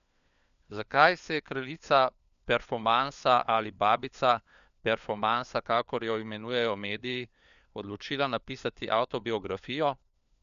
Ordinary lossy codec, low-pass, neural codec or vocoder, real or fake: none; 7.2 kHz; codec, 16 kHz, 4 kbps, FunCodec, trained on LibriTTS, 50 frames a second; fake